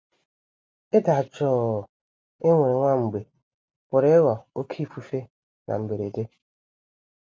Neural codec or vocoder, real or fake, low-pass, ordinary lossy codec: none; real; none; none